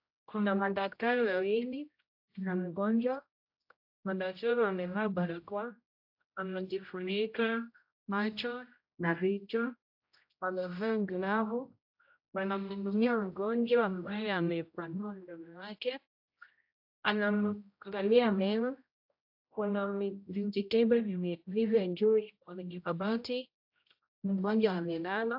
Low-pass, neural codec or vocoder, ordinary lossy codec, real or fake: 5.4 kHz; codec, 16 kHz, 0.5 kbps, X-Codec, HuBERT features, trained on general audio; AAC, 48 kbps; fake